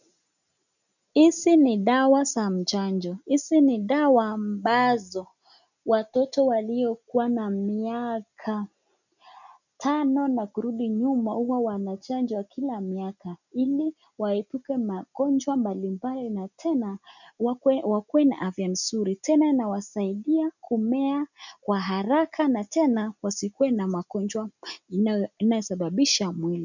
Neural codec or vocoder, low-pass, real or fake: none; 7.2 kHz; real